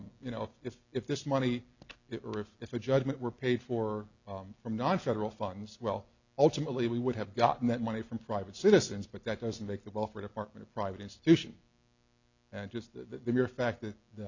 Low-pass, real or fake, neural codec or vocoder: 7.2 kHz; real; none